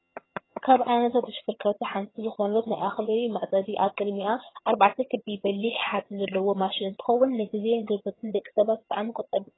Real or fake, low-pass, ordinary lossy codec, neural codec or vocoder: fake; 7.2 kHz; AAC, 16 kbps; vocoder, 22.05 kHz, 80 mel bands, HiFi-GAN